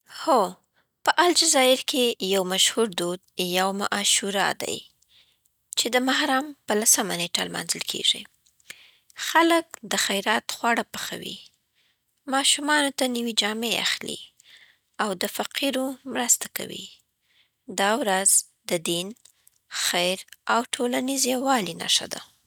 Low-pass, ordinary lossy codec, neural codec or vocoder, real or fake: none; none; none; real